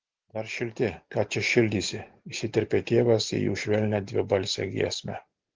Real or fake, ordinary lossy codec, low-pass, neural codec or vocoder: real; Opus, 16 kbps; 7.2 kHz; none